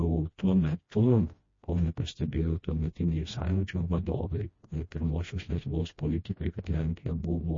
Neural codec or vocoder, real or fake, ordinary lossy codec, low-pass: codec, 16 kHz, 1 kbps, FreqCodec, smaller model; fake; MP3, 32 kbps; 7.2 kHz